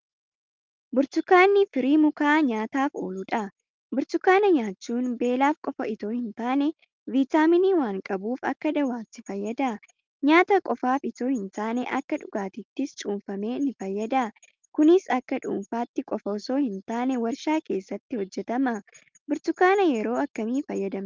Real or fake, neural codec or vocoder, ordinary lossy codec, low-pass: real; none; Opus, 24 kbps; 7.2 kHz